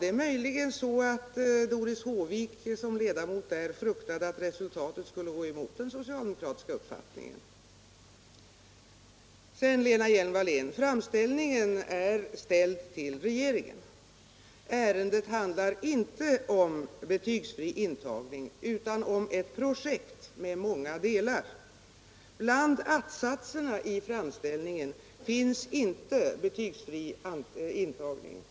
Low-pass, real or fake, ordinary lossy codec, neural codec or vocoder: none; real; none; none